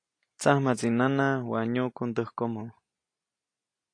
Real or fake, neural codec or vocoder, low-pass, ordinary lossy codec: real; none; 9.9 kHz; AAC, 48 kbps